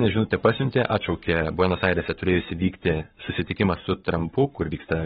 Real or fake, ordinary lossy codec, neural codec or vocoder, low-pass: fake; AAC, 16 kbps; codec, 44.1 kHz, 7.8 kbps, Pupu-Codec; 19.8 kHz